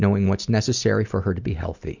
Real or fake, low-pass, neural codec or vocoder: real; 7.2 kHz; none